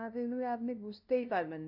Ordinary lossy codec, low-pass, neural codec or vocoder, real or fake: none; 5.4 kHz; codec, 16 kHz, 0.5 kbps, FunCodec, trained on LibriTTS, 25 frames a second; fake